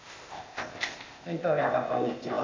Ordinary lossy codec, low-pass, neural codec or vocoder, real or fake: MP3, 64 kbps; 7.2 kHz; codec, 16 kHz, 0.8 kbps, ZipCodec; fake